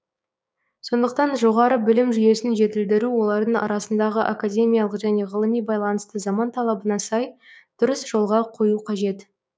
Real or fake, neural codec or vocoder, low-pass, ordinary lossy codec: fake; codec, 16 kHz, 6 kbps, DAC; none; none